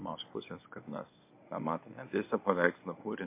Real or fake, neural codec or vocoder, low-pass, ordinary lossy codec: fake; codec, 24 kHz, 0.9 kbps, WavTokenizer, medium speech release version 1; 3.6 kHz; MP3, 24 kbps